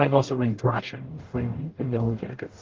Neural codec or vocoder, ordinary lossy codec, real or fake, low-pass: codec, 44.1 kHz, 0.9 kbps, DAC; Opus, 16 kbps; fake; 7.2 kHz